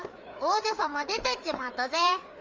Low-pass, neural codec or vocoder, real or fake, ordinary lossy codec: 7.2 kHz; codec, 16 kHz, 4 kbps, FreqCodec, larger model; fake; Opus, 32 kbps